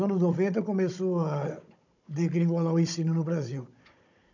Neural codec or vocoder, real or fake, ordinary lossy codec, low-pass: codec, 16 kHz, 16 kbps, FunCodec, trained on Chinese and English, 50 frames a second; fake; none; 7.2 kHz